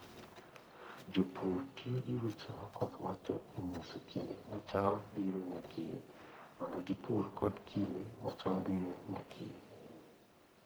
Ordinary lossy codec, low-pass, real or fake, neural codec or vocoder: none; none; fake; codec, 44.1 kHz, 1.7 kbps, Pupu-Codec